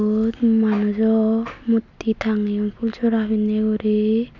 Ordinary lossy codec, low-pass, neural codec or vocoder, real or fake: AAC, 48 kbps; 7.2 kHz; none; real